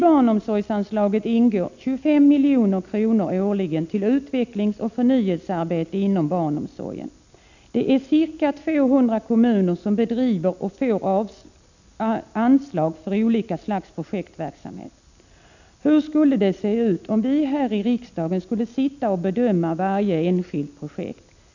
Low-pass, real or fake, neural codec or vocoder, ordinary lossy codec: 7.2 kHz; real; none; none